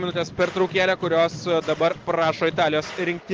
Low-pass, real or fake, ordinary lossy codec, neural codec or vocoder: 7.2 kHz; real; Opus, 16 kbps; none